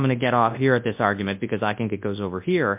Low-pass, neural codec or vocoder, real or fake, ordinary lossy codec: 3.6 kHz; codec, 24 kHz, 0.9 kbps, WavTokenizer, large speech release; fake; MP3, 32 kbps